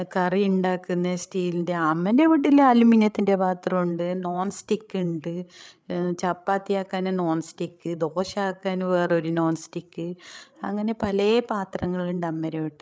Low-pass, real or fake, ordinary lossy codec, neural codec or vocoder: none; fake; none; codec, 16 kHz, 8 kbps, FreqCodec, larger model